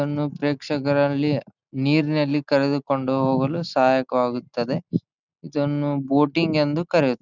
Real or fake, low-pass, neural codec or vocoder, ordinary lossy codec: real; 7.2 kHz; none; none